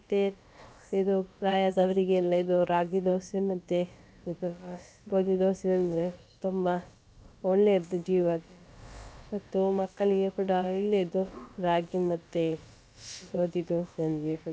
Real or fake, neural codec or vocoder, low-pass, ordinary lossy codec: fake; codec, 16 kHz, about 1 kbps, DyCAST, with the encoder's durations; none; none